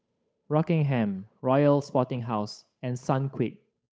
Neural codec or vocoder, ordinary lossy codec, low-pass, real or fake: codec, 16 kHz, 8 kbps, FunCodec, trained on Chinese and English, 25 frames a second; none; none; fake